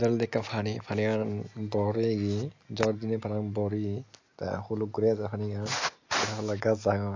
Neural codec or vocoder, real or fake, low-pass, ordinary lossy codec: none; real; 7.2 kHz; AAC, 48 kbps